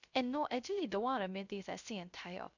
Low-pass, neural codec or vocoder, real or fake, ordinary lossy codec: 7.2 kHz; codec, 16 kHz, 0.3 kbps, FocalCodec; fake; none